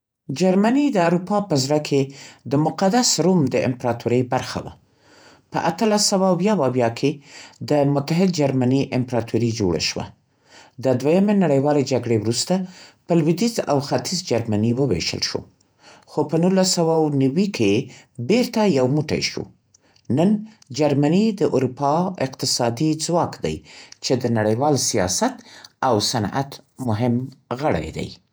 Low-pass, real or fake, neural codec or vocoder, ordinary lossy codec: none; fake; vocoder, 48 kHz, 128 mel bands, Vocos; none